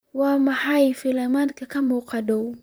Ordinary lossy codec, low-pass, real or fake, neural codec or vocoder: none; none; fake; vocoder, 44.1 kHz, 128 mel bands, Pupu-Vocoder